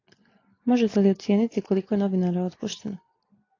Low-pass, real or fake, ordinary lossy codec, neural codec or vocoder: 7.2 kHz; real; AAC, 32 kbps; none